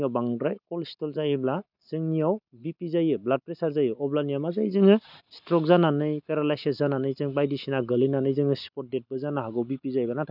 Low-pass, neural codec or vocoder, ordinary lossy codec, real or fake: 5.4 kHz; none; none; real